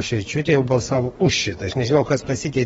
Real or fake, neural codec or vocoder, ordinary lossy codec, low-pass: fake; codec, 32 kHz, 1.9 kbps, SNAC; AAC, 24 kbps; 14.4 kHz